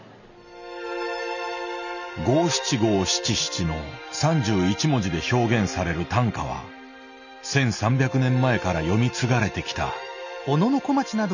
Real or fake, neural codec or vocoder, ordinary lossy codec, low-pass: real; none; none; 7.2 kHz